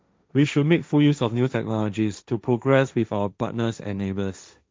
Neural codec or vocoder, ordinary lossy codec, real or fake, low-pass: codec, 16 kHz, 1.1 kbps, Voila-Tokenizer; none; fake; none